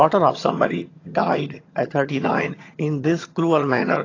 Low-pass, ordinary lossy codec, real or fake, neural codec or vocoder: 7.2 kHz; AAC, 32 kbps; fake; vocoder, 22.05 kHz, 80 mel bands, HiFi-GAN